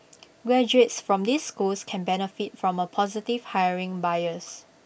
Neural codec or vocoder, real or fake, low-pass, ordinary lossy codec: none; real; none; none